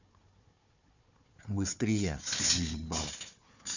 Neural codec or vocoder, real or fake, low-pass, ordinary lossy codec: codec, 16 kHz, 4 kbps, FunCodec, trained on Chinese and English, 50 frames a second; fake; 7.2 kHz; none